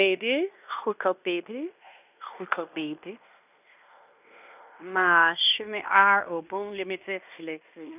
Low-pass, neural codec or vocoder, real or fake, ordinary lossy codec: 3.6 kHz; codec, 16 kHz in and 24 kHz out, 0.9 kbps, LongCat-Audio-Codec, fine tuned four codebook decoder; fake; none